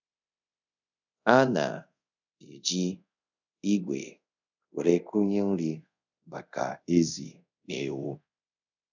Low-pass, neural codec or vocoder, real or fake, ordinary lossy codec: 7.2 kHz; codec, 24 kHz, 0.5 kbps, DualCodec; fake; none